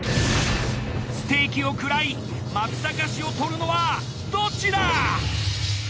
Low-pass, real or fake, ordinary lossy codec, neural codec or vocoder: none; real; none; none